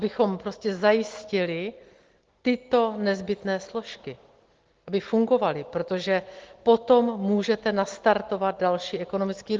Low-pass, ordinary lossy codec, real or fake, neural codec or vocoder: 7.2 kHz; Opus, 16 kbps; real; none